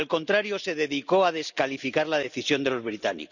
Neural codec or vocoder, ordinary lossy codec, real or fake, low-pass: none; none; real; 7.2 kHz